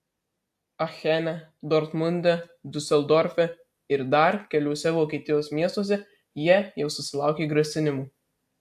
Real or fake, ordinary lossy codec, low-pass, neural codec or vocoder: real; AAC, 96 kbps; 14.4 kHz; none